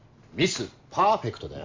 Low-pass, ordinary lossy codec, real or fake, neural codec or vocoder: 7.2 kHz; MP3, 64 kbps; real; none